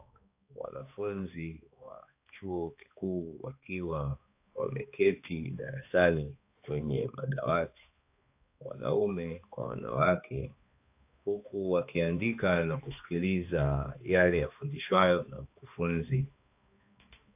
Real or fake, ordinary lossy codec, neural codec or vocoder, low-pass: fake; AAC, 32 kbps; codec, 16 kHz, 2 kbps, X-Codec, HuBERT features, trained on balanced general audio; 3.6 kHz